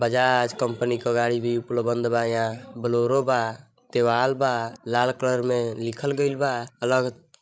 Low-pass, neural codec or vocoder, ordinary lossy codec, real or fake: none; codec, 16 kHz, 16 kbps, FreqCodec, larger model; none; fake